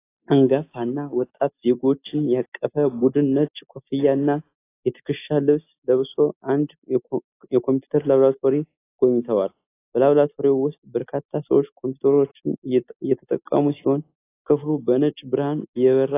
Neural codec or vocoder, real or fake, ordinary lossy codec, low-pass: none; real; AAC, 24 kbps; 3.6 kHz